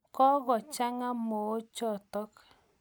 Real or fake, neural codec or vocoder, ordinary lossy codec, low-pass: real; none; none; none